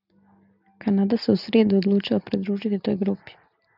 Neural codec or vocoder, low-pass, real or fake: none; 5.4 kHz; real